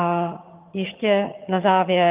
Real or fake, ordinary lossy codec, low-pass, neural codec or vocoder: fake; Opus, 32 kbps; 3.6 kHz; vocoder, 22.05 kHz, 80 mel bands, HiFi-GAN